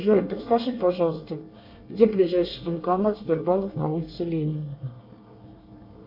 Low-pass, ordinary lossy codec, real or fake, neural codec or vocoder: 5.4 kHz; MP3, 48 kbps; fake; codec, 24 kHz, 1 kbps, SNAC